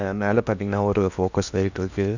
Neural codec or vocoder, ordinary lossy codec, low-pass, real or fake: codec, 16 kHz in and 24 kHz out, 0.8 kbps, FocalCodec, streaming, 65536 codes; none; 7.2 kHz; fake